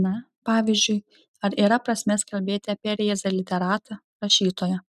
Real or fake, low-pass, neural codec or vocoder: real; 14.4 kHz; none